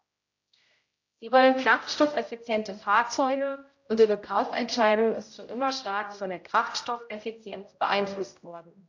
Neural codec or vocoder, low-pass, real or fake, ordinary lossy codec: codec, 16 kHz, 0.5 kbps, X-Codec, HuBERT features, trained on general audio; 7.2 kHz; fake; AAC, 48 kbps